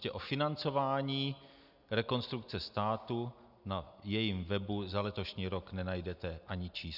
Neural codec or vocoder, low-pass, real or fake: none; 5.4 kHz; real